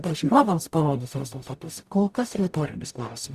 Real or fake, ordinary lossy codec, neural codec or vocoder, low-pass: fake; Opus, 64 kbps; codec, 44.1 kHz, 0.9 kbps, DAC; 14.4 kHz